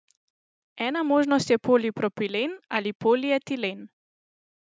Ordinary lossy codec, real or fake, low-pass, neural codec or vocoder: none; real; none; none